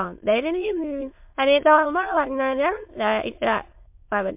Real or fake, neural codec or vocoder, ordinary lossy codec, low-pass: fake; autoencoder, 22.05 kHz, a latent of 192 numbers a frame, VITS, trained on many speakers; MP3, 32 kbps; 3.6 kHz